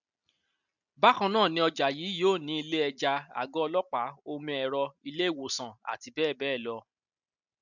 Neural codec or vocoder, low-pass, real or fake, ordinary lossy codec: none; 7.2 kHz; real; none